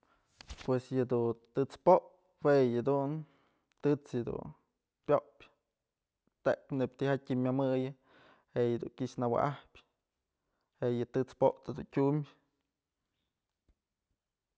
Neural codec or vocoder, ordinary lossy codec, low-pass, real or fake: none; none; none; real